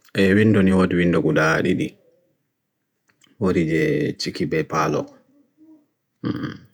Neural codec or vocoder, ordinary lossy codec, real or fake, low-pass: vocoder, 48 kHz, 128 mel bands, Vocos; none; fake; 19.8 kHz